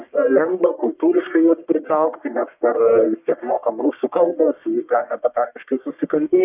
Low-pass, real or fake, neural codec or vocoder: 3.6 kHz; fake; codec, 44.1 kHz, 1.7 kbps, Pupu-Codec